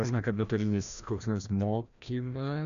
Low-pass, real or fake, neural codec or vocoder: 7.2 kHz; fake; codec, 16 kHz, 1 kbps, FreqCodec, larger model